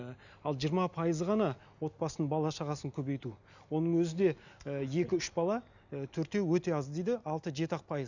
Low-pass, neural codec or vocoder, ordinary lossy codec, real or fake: 7.2 kHz; none; none; real